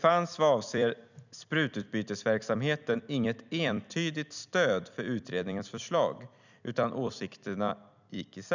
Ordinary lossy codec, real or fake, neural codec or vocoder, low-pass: none; fake; vocoder, 44.1 kHz, 128 mel bands every 256 samples, BigVGAN v2; 7.2 kHz